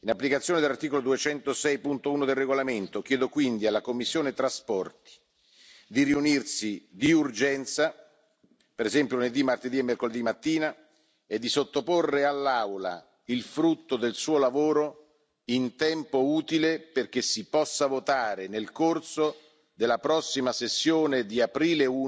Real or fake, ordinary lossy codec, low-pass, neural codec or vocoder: real; none; none; none